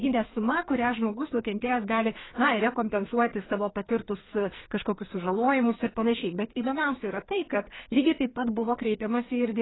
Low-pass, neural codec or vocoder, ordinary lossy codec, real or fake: 7.2 kHz; codec, 44.1 kHz, 2.6 kbps, SNAC; AAC, 16 kbps; fake